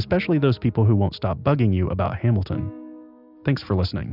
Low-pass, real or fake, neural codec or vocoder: 5.4 kHz; real; none